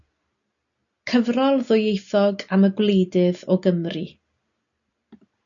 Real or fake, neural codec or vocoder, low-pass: real; none; 7.2 kHz